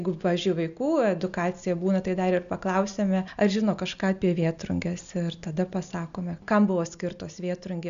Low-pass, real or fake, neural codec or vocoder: 7.2 kHz; real; none